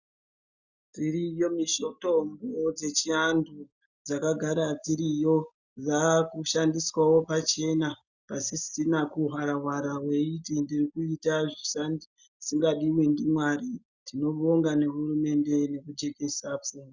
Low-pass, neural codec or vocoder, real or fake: 7.2 kHz; none; real